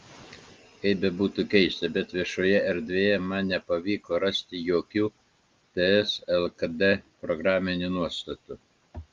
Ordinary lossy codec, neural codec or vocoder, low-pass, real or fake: Opus, 24 kbps; none; 7.2 kHz; real